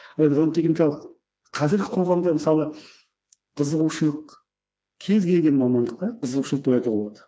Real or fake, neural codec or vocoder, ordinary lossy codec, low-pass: fake; codec, 16 kHz, 2 kbps, FreqCodec, smaller model; none; none